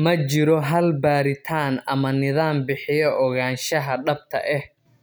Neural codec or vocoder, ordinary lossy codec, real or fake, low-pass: none; none; real; none